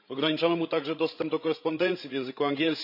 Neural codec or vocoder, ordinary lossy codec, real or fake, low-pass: codec, 16 kHz, 16 kbps, FreqCodec, larger model; none; fake; 5.4 kHz